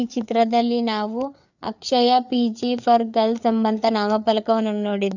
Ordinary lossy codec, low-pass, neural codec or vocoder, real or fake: none; 7.2 kHz; codec, 16 kHz, 4 kbps, FreqCodec, larger model; fake